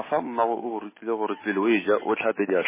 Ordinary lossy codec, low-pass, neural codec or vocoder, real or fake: MP3, 16 kbps; 3.6 kHz; none; real